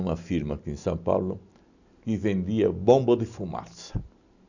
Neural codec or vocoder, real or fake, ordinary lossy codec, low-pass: none; real; none; 7.2 kHz